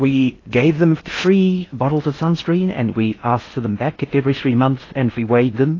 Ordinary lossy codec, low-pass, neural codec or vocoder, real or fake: AAC, 32 kbps; 7.2 kHz; codec, 16 kHz in and 24 kHz out, 0.6 kbps, FocalCodec, streaming, 4096 codes; fake